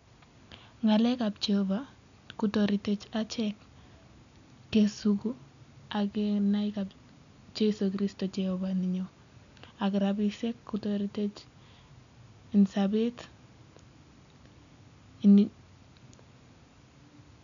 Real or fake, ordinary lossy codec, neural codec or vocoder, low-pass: real; none; none; 7.2 kHz